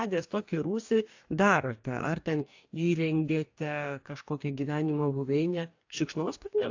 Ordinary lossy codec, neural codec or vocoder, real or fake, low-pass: AAC, 48 kbps; codec, 44.1 kHz, 2.6 kbps, DAC; fake; 7.2 kHz